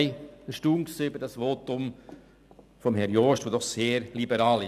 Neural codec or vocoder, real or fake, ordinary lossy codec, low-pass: none; real; none; 14.4 kHz